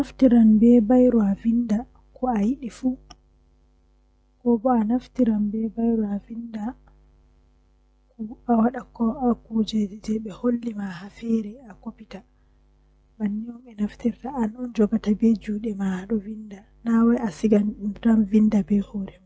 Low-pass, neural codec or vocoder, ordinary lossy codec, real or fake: none; none; none; real